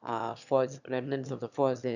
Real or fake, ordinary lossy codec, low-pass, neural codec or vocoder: fake; none; 7.2 kHz; autoencoder, 22.05 kHz, a latent of 192 numbers a frame, VITS, trained on one speaker